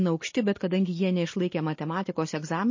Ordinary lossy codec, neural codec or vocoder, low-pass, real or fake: MP3, 32 kbps; none; 7.2 kHz; real